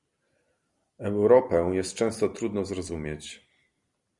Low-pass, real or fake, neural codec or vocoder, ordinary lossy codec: 10.8 kHz; fake; vocoder, 44.1 kHz, 128 mel bands every 256 samples, BigVGAN v2; Opus, 64 kbps